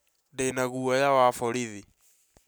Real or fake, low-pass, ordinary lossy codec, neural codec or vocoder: real; none; none; none